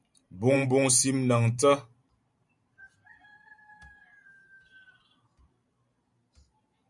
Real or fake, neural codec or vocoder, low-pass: fake; vocoder, 44.1 kHz, 128 mel bands every 512 samples, BigVGAN v2; 10.8 kHz